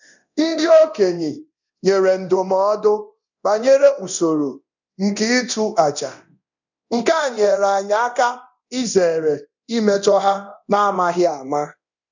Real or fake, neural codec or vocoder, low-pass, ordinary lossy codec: fake; codec, 24 kHz, 0.9 kbps, DualCodec; 7.2 kHz; none